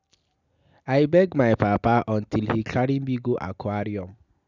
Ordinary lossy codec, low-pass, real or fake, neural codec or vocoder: none; 7.2 kHz; real; none